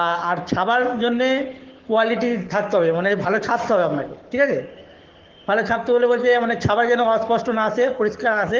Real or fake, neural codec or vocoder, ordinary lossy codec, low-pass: fake; codec, 44.1 kHz, 7.8 kbps, Pupu-Codec; Opus, 16 kbps; 7.2 kHz